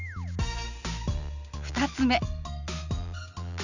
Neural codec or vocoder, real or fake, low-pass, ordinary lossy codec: none; real; 7.2 kHz; none